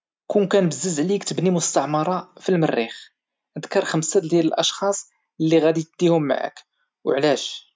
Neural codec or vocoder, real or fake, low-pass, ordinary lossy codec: none; real; none; none